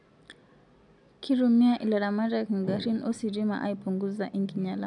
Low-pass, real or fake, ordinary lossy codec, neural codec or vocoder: 10.8 kHz; real; none; none